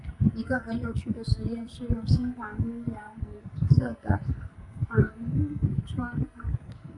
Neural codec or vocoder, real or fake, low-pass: codec, 44.1 kHz, 2.6 kbps, SNAC; fake; 10.8 kHz